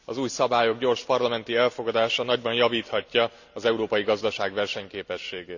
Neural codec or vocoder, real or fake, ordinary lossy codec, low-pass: none; real; none; 7.2 kHz